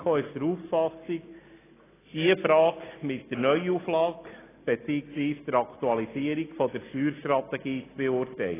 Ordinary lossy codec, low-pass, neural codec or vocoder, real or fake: AAC, 16 kbps; 3.6 kHz; none; real